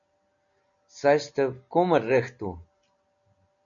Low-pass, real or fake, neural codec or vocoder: 7.2 kHz; real; none